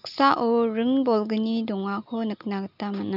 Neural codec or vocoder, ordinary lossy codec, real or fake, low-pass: none; none; real; 5.4 kHz